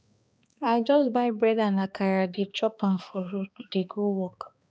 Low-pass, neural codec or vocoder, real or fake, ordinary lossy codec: none; codec, 16 kHz, 2 kbps, X-Codec, HuBERT features, trained on balanced general audio; fake; none